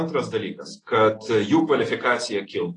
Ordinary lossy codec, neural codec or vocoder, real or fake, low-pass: AAC, 32 kbps; none; real; 10.8 kHz